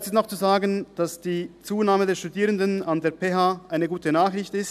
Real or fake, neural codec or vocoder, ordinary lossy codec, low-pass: real; none; none; 14.4 kHz